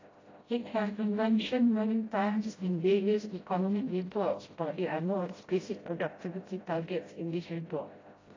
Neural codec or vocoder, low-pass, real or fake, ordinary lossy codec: codec, 16 kHz, 0.5 kbps, FreqCodec, smaller model; 7.2 kHz; fake; AAC, 32 kbps